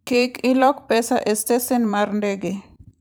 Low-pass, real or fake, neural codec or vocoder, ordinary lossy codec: none; fake; vocoder, 44.1 kHz, 128 mel bands every 512 samples, BigVGAN v2; none